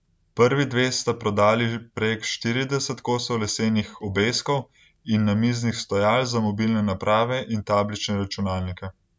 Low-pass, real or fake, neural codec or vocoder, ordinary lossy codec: none; real; none; none